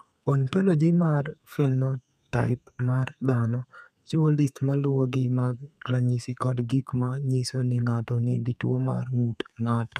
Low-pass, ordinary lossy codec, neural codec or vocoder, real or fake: 14.4 kHz; none; codec, 32 kHz, 1.9 kbps, SNAC; fake